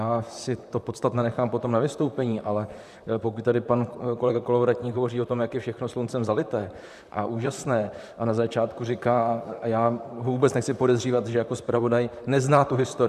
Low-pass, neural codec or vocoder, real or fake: 14.4 kHz; vocoder, 44.1 kHz, 128 mel bands, Pupu-Vocoder; fake